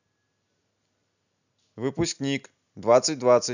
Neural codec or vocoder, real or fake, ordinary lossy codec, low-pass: none; real; none; 7.2 kHz